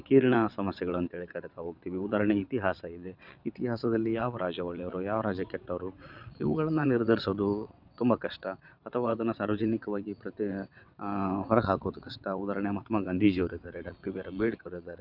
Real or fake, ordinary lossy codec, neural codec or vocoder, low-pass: fake; none; vocoder, 22.05 kHz, 80 mel bands, WaveNeXt; 5.4 kHz